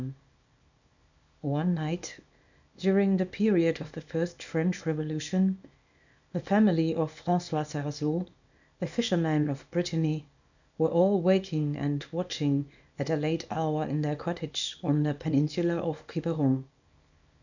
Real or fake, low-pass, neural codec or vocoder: fake; 7.2 kHz; codec, 24 kHz, 0.9 kbps, WavTokenizer, small release